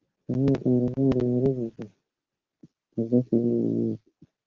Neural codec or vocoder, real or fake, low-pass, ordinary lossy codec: none; real; 7.2 kHz; Opus, 16 kbps